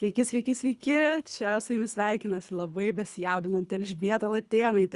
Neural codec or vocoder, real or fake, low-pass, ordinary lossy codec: codec, 24 kHz, 3 kbps, HILCodec; fake; 10.8 kHz; Opus, 64 kbps